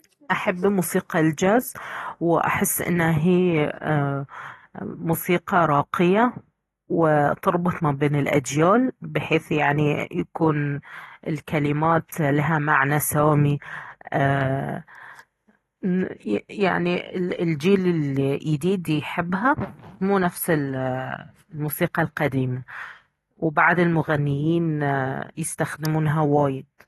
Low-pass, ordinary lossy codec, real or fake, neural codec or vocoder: 19.8 kHz; AAC, 32 kbps; real; none